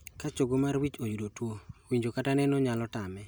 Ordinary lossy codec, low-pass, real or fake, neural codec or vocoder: none; none; real; none